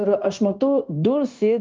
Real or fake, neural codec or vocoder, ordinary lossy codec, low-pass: fake; codec, 16 kHz, 0.9 kbps, LongCat-Audio-Codec; Opus, 32 kbps; 7.2 kHz